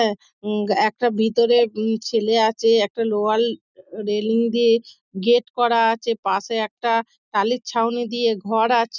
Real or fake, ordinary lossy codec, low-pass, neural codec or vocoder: real; none; 7.2 kHz; none